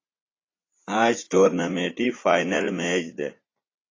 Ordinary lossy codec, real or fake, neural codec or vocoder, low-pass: AAC, 32 kbps; fake; vocoder, 44.1 kHz, 80 mel bands, Vocos; 7.2 kHz